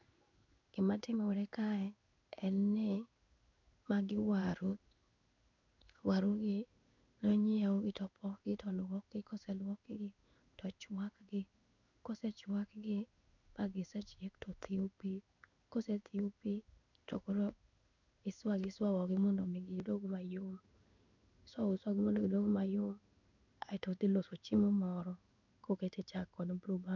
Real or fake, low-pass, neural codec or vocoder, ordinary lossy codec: fake; 7.2 kHz; codec, 16 kHz in and 24 kHz out, 1 kbps, XY-Tokenizer; none